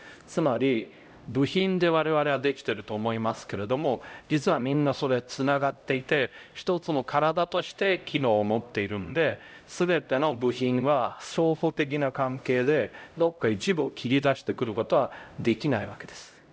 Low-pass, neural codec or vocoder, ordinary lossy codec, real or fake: none; codec, 16 kHz, 0.5 kbps, X-Codec, HuBERT features, trained on LibriSpeech; none; fake